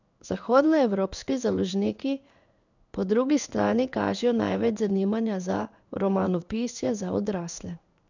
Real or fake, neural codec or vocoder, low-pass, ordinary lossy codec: fake; codec, 16 kHz in and 24 kHz out, 1 kbps, XY-Tokenizer; 7.2 kHz; none